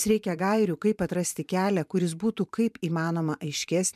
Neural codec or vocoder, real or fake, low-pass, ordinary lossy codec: vocoder, 44.1 kHz, 128 mel bands every 512 samples, BigVGAN v2; fake; 14.4 kHz; MP3, 96 kbps